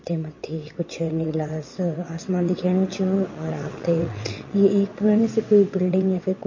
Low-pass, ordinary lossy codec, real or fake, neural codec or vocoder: 7.2 kHz; MP3, 32 kbps; fake; vocoder, 22.05 kHz, 80 mel bands, WaveNeXt